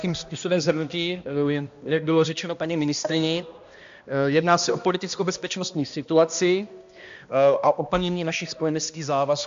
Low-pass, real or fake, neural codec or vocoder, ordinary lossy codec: 7.2 kHz; fake; codec, 16 kHz, 1 kbps, X-Codec, HuBERT features, trained on balanced general audio; AAC, 64 kbps